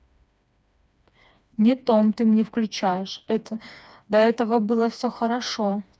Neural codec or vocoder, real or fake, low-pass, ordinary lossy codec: codec, 16 kHz, 2 kbps, FreqCodec, smaller model; fake; none; none